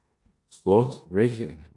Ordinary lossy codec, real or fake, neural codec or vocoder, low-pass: AAC, 64 kbps; fake; codec, 16 kHz in and 24 kHz out, 0.9 kbps, LongCat-Audio-Codec, four codebook decoder; 10.8 kHz